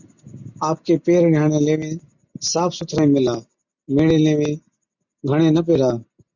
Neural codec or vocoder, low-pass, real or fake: none; 7.2 kHz; real